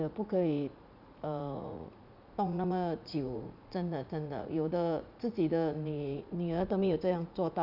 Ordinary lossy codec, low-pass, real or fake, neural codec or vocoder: none; 5.4 kHz; fake; vocoder, 44.1 kHz, 80 mel bands, Vocos